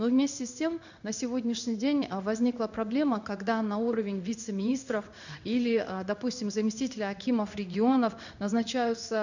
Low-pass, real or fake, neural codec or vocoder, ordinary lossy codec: 7.2 kHz; fake; codec, 16 kHz in and 24 kHz out, 1 kbps, XY-Tokenizer; none